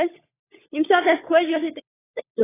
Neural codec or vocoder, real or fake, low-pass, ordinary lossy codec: codec, 16 kHz, 16 kbps, FunCodec, trained on LibriTTS, 50 frames a second; fake; 3.6 kHz; AAC, 16 kbps